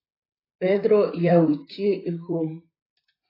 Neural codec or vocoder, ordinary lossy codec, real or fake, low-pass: vocoder, 44.1 kHz, 128 mel bands, Pupu-Vocoder; AAC, 24 kbps; fake; 5.4 kHz